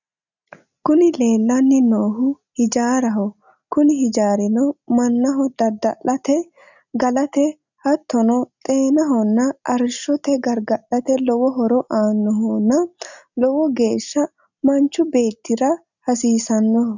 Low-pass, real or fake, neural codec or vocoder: 7.2 kHz; real; none